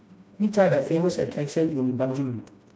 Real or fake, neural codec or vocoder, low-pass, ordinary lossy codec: fake; codec, 16 kHz, 1 kbps, FreqCodec, smaller model; none; none